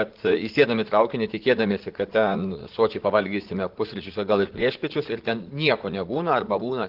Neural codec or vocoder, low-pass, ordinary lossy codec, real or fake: vocoder, 44.1 kHz, 80 mel bands, Vocos; 5.4 kHz; Opus, 16 kbps; fake